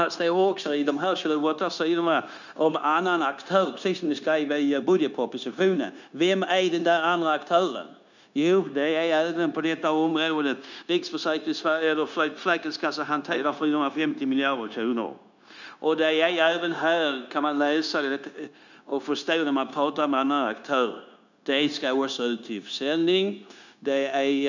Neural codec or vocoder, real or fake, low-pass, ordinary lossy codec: codec, 16 kHz, 0.9 kbps, LongCat-Audio-Codec; fake; 7.2 kHz; none